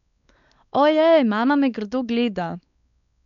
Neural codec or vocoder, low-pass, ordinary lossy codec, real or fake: codec, 16 kHz, 4 kbps, X-Codec, WavLM features, trained on Multilingual LibriSpeech; 7.2 kHz; none; fake